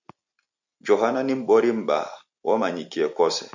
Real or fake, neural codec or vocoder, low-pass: real; none; 7.2 kHz